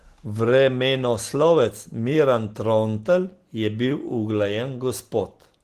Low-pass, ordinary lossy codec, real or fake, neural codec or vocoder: 14.4 kHz; Opus, 16 kbps; real; none